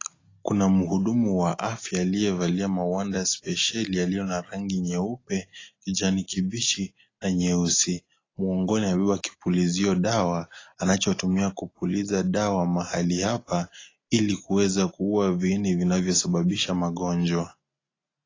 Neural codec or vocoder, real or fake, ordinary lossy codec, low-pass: none; real; AAC, 32 kbps; 7.2 kHz